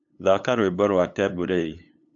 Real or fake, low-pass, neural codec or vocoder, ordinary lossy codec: fake; 7.2 kHz; codec, 16 kHz, 8 kbps, FunCodec, trained on LibriTTS, 25 frames a second; AAC, 64 kbps